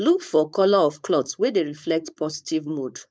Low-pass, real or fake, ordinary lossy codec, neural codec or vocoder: none; fake; none; codec, 16 kHz, 4.8 kbps, FACodec